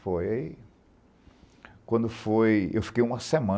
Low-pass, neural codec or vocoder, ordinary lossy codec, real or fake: none; none; none; real